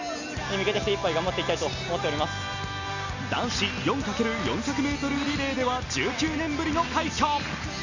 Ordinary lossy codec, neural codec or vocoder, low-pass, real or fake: none; none; 7.2 kHz; real